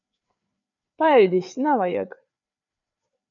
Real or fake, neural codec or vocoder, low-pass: fake; codec, 16 kHz, 4 kbps, FreqCodec, larger model; 7.2 kHz